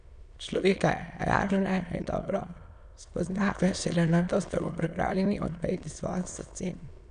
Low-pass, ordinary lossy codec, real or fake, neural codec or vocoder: 9.9 kHz; none; fake; autoencoder, 22.05 kHz, a latent of 192 numbers a frame, VITS, trained on many speakers